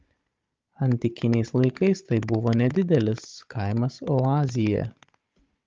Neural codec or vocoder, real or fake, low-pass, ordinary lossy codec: codec, 16 kHz, 16 kbps, FunCodec, trained on Chinese and English, 50 frames a second; fake; 7.2 kHz; Opus, 32 kbps